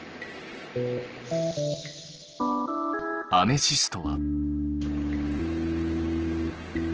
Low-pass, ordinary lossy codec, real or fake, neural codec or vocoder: 7.2 kHz; Opus, 16 kbps; real; none